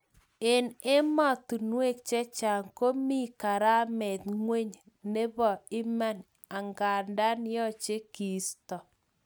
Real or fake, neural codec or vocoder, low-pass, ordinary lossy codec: real; none; none; none